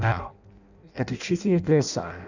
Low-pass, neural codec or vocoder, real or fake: 7.2 kHz; codec, 16 kHz in and 24 kHz out, 0.6 kbps, FireRedTTS-2 codec; fake